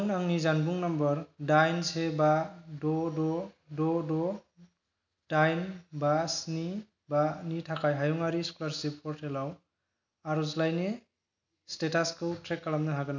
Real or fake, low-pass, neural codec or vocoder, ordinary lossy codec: real; 7.2 kHz; none; none